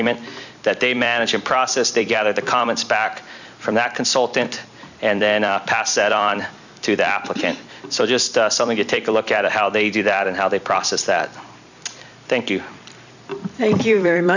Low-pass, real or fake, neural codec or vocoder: 7.2 kHz; fake; vocoder, 44.1 kHz, 128 mel bands every 256 samples, BigVGAN v2